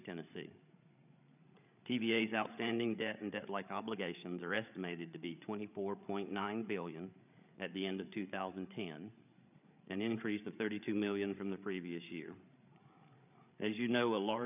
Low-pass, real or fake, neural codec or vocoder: 3.6 kHz; fake; codec, 16 kHz, 8 kbps, FreqCodec, larger model